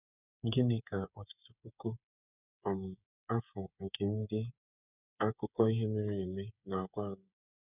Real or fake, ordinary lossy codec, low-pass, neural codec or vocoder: fake; none; 3.6 kHz; codec, 16 kHz, 8 kbps, FreqCodec, smaller model